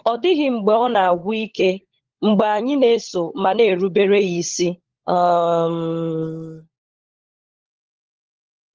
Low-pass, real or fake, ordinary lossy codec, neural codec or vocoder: 7.2 kHz; fake; Opus, 16 kbps; codec, 16 kHz, 16 kbps, FunCodec, trained on LibriTTS, 50 frames a second